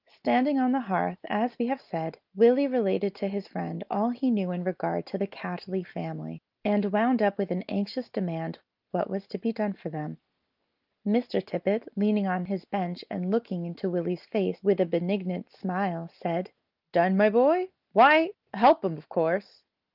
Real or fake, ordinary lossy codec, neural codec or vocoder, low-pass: real; Opus, 24 kbps; none; 5.4 kHz